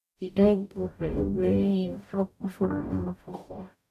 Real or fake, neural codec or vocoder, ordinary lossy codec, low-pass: fake; codec, 44.1 kHz, 0.9 kbps, DAC; none; 14.4 kHz